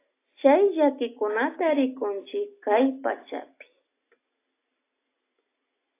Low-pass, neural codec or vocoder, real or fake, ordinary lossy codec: 3.6 kHz; none; real; AAC, 24 kbps